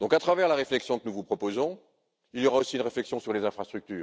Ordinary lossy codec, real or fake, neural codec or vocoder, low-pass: none; real; none; none